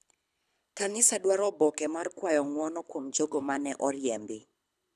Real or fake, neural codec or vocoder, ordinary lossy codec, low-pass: fake; codec, 24 kHz, 6 kbps, HILCodec; none; none